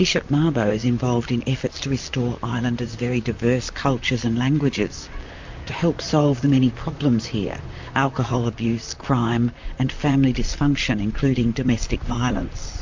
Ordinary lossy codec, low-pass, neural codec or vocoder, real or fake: MP3, 64 kbps; 7.2 kHz; vocoder, 44.1 kHz, 128 mel bands, Pupu-Vocoder; fake